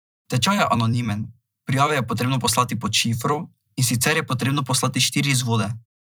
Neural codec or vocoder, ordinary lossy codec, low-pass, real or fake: vocoder, 44.1 kHz, 128 mel bands every 256 samples, BigVGAN v2; none; none; fake